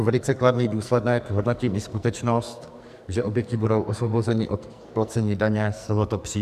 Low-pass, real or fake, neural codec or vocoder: 14.4 kHz; fake; codec, 44.1 kHz, 2.6 kbps, SNAC